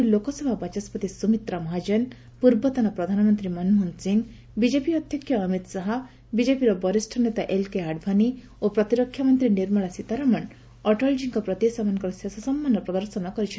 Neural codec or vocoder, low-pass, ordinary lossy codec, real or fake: none; none; none; real